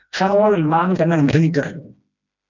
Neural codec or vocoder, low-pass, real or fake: codec, 16 kHz, 1 kbps, FreqCodec, smaller model; 7.2 kHz; fake